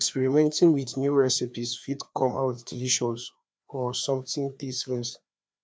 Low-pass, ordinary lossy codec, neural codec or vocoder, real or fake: none; none; codec, 16 kHz, 2 kbps, FreqCodec, larger model; fake